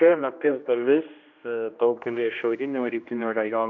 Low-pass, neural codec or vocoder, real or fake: 7.2 kHz; codec, 16 kHz, 1 kbps, X-Codec, HuBERT features, trained on general audio; fake